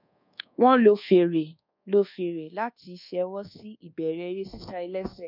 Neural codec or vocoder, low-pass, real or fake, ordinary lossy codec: codec, 24 kHz, 1.2 kbps, DualCodec; 5.4 kHz; fake; none